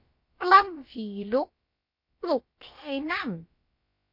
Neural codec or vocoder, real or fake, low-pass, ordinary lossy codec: codec, 16 kHz, about 1 kbps, DyCAST, with the encoder's durations; fake; 5.4 kHz; MP3, 32 kbps